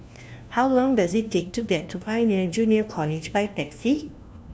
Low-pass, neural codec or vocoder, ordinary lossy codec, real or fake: none; codec, 16 kHz, 1 kbps, FunCodec, trained on LibriTTS, 50 frames a second; none; fake